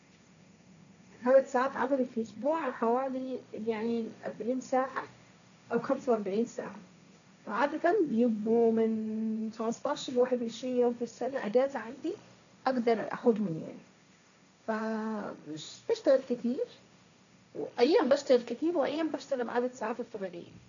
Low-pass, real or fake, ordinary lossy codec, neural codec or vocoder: 7.2 kHz; fake; none; codec, 16 kHz, 1.1 kbps, Voila-Tokenizer